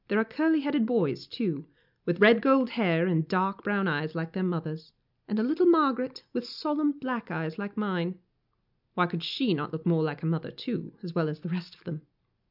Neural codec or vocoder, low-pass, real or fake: none; 5.4 kHz; real